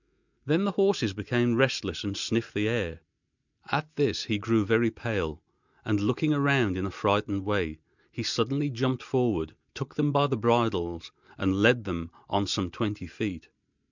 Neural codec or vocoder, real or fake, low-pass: none; real; 7.2 kHz